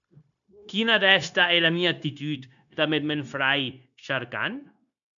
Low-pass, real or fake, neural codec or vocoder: 7.2 kHz; fake; codec, 16 kHz, 0.9 kbps, LongCat-Audio-Codec